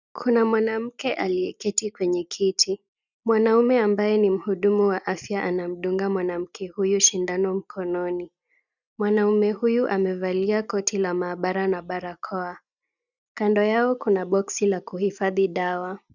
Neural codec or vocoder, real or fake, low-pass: none; real; 7.2 kHz